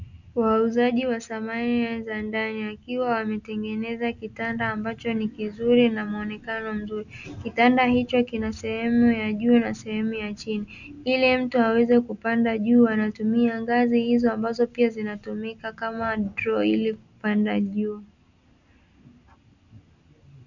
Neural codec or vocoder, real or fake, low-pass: none; real; 7.2 kHz